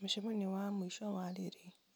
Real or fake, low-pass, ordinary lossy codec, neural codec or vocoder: real; none; none; none